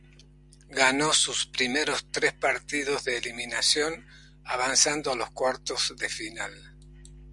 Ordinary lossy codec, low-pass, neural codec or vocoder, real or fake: Opus, 64 kbps; 10.8 kHz; none; real